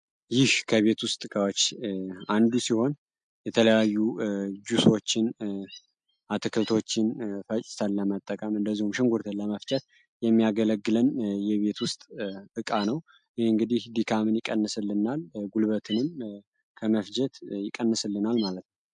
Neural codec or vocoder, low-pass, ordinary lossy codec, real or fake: none; 9.9 kHz; MP3, 64 kbps; real